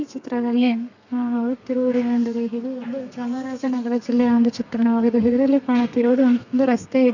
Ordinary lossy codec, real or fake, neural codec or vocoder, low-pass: none; fake; codec, 32 kHz, 1.9 kbps, SNAC; 7.2 kHz